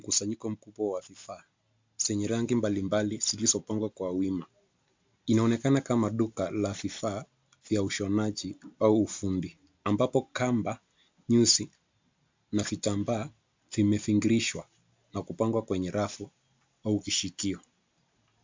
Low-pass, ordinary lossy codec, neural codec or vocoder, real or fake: 7.2 kHz; MP3, 64 kbps; none; real